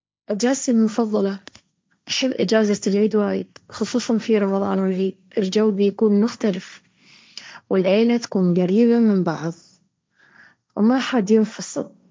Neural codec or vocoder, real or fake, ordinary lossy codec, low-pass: codec, 16 kHz, 1.1 kbps, Voila-Tokenizer; fake; none; none